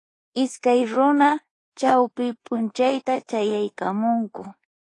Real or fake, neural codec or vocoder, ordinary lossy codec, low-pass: fake; codec, 24 kHz, 1.2 kbps, DualCodec; AAC, 32 kbps; 10.8 kHz